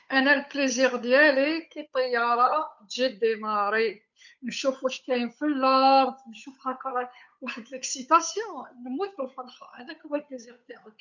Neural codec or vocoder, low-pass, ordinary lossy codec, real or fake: codec, 16 kHz, 8 kbps, FunCodec, trained on Chinese and English, 25 frames a second; 7.2 kHz; none; fake